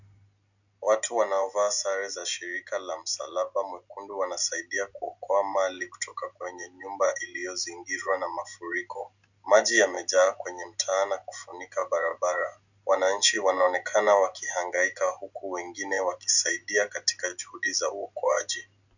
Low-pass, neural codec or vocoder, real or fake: 7.2 kHz; none; real